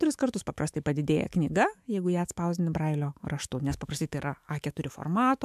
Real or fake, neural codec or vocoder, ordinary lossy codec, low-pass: fake; autoencoder, 48 kHz, 128 numbers a frame, DAC-VAE, trained on Japanese speech; MP3, 96 kbps; 14.4 kHz